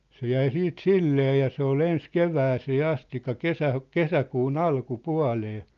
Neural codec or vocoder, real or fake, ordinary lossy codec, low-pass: none; real; Opus, 32 kbps; 7.2 kHz